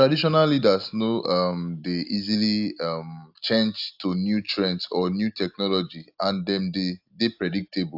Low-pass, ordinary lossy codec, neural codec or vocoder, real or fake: 5.4 kHz; AAC, 48 kbps; none; real